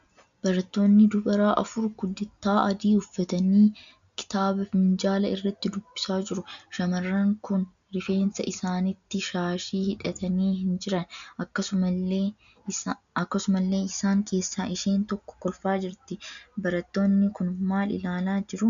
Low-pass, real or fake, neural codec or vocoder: 7.2 kHz; real; none